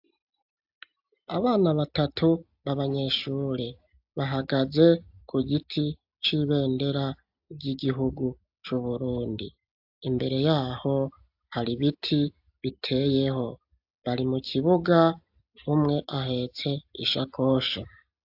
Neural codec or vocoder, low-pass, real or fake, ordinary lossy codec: none; 5.4 kHz; real; AAC, 48 kbps